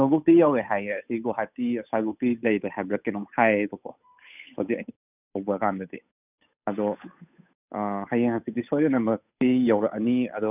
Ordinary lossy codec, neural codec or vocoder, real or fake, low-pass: none; codec, 16 kHz, 8 kbps, FunCodec, trained on Chinese and English, 25 frames a second; fake; 3.6 kHz